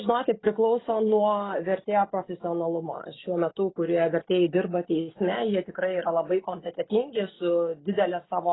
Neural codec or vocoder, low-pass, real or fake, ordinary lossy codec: none; 7.2 kHz; real; AAC, 16 kbps